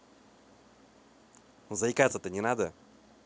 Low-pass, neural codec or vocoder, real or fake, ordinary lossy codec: none; none; real; none